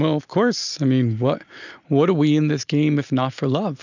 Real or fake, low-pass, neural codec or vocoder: real; 7.2 kHz; none